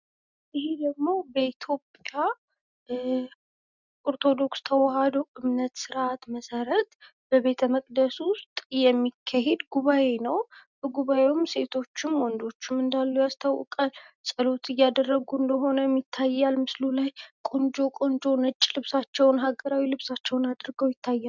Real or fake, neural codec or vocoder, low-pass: real; none; 7.2 kHz